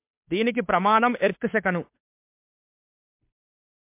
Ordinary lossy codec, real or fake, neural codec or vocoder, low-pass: MP3, 32 kbps; fake; codec, 16 kHz, 2 kbps, FunCodec, trained on Chinese and English, 25 frames a second; 3.6 kHz